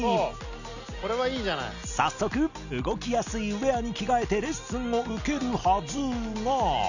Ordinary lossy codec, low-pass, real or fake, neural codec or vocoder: none; 7.2 kHz; real; none